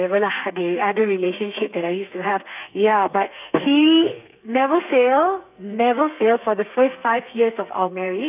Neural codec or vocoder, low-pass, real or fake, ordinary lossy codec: codec, 32 kHz, 1.9 kbps, SNAC; 3.6 kHz; fake; none